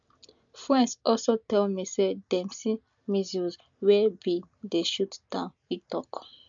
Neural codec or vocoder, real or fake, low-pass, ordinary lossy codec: none; real; 7.2 kHz; MP3, 64 kbps